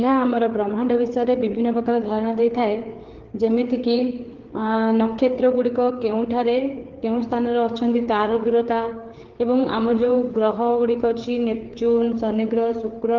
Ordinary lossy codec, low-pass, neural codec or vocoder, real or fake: Opus, 16 kbps; 7.2 kHz; codec, 16 kHz, 4 kbps, FreqCodec, larger model; fake